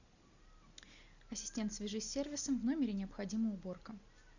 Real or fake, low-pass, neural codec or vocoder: real; 7.2 kHz; none